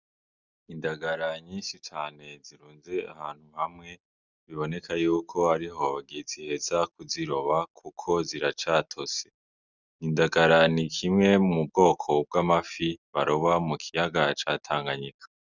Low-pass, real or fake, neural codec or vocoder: 7.2 kHz; real; none